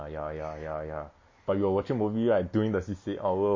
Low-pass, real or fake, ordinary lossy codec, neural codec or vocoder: 7.2 kHz; real; MP3, 32 kbps; none